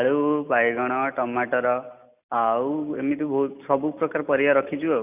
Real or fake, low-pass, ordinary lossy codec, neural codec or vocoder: real; 3.6 kHz; none; none